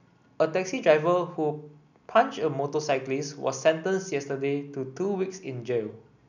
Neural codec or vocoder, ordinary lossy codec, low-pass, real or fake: none; none; 7.2 kHz; real